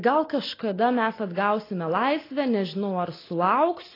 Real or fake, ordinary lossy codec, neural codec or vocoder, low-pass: real; AAC, 24 kbps; none; 5.4 kHz